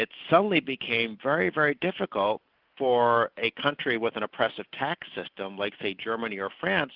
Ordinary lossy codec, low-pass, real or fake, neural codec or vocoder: Opus, 24 kbps; 5.4 kHz; real; none